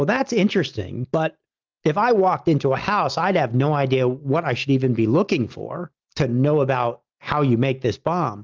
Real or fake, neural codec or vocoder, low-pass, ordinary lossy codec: real; none; 7.2 kHz; Opus, 32 kbps